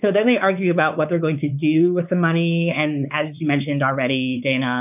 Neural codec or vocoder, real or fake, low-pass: codec, 16 kHz, 4 kbps, X-Codec, WavLM features, trained on Multilingual LibriSpeech; fake; 3.6 kHz